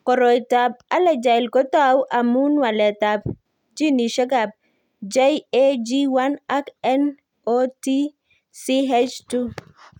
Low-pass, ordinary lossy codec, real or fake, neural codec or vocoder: 19.8 kHz; none; real; none